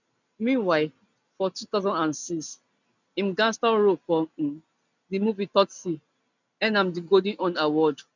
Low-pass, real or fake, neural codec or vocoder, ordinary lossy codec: 7.2 kHz; real; none; none